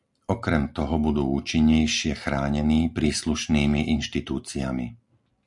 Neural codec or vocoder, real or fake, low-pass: none; real; 10.8 kHz